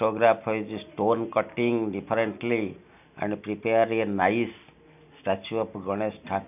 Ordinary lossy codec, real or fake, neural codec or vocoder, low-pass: none; real; none; 3.6 kHz